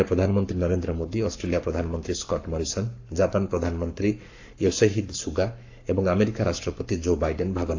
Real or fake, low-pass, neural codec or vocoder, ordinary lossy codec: fake; 7.2 kHz; codec, 44.1 kHz, 7.8 kbps, Pupu-Codec; AAC, 48 kbps